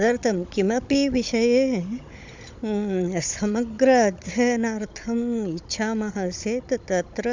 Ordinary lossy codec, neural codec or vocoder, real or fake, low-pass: MP3, 64 kbps; codec, 16 kHz, 16 kbps, FunCodec, trained on Chinese and English, 50 frames a second; fake; 7.2 kHz